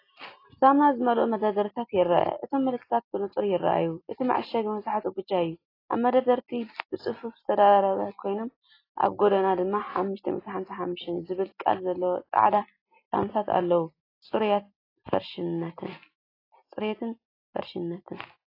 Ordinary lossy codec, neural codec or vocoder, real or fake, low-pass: AAC, 24 kbps; none; real; 5.4 kHz